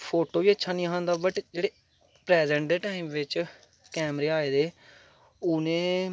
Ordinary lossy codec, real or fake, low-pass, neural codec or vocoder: none; real; none; none